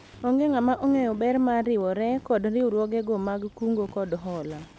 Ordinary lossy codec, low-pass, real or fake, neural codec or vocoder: none; none; real; none